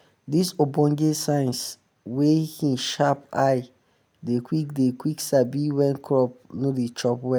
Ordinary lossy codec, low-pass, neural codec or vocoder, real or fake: none; none; none; real